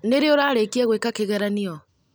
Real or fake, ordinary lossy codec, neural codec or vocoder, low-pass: real; none; none; none